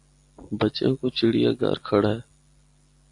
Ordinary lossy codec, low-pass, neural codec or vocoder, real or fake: AAC, 48 kbps; 10.8 kHz; none; real